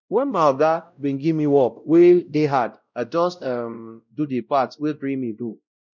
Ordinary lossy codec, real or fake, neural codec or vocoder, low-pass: none; fake; codec, 16 kHz, 0.5 kbps, X-Codec, WavLM features, trained on Multilingual LibriSpeech; 7.2 kHz